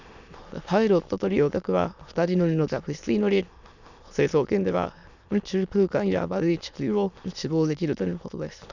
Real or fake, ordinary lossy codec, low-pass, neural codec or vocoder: fake; none; 7.2 kHz; autoencoder, 22.05 kHz, a latent of 192 numbers a frame, VITS, trained on many speakers